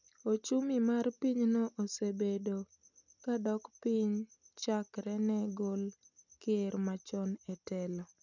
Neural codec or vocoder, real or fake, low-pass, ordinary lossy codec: none; real; 7.2 kHz; none